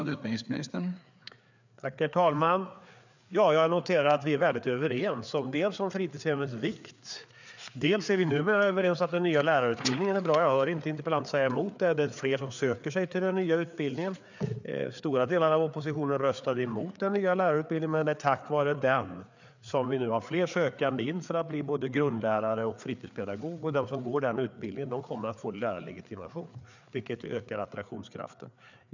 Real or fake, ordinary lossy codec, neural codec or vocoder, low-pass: fake; none; codec, 16 kHz, 4 kbps, FreqCodec, larger model; 7.2 kHz